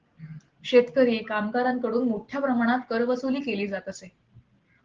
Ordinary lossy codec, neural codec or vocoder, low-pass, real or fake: Opus, 16 kbps; none; 7.2 kHz; real